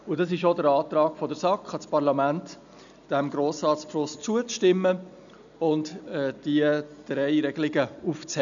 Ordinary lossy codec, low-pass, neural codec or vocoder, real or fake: none; 7.2 kHz; none; real